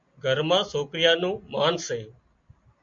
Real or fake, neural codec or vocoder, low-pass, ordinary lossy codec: real; none; 7.2 kHz; MP3, 48 kbps